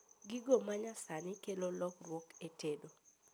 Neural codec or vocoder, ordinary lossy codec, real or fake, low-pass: none; none; real; none